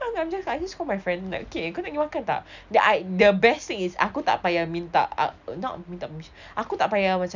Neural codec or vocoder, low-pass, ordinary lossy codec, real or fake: none; 7.2 kHz; none; real